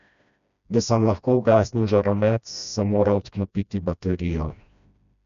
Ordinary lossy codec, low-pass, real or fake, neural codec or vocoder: none; 7.2 kHz; fake; codec, 16 kHz, 1 kbps, FreqCodec, smaller model